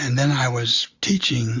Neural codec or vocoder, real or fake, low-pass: none; real; 7.2 kHz